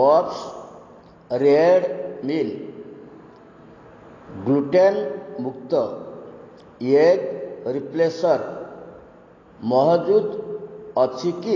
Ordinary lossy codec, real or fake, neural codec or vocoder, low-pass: AAC, 32 kbps; real; none; 7.2 kHz